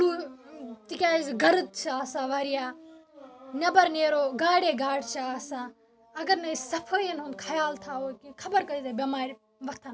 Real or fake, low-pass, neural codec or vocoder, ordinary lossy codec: real; none; none; none